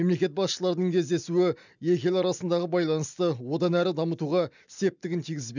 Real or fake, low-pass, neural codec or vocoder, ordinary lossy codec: real; 7.2 kHz; none; none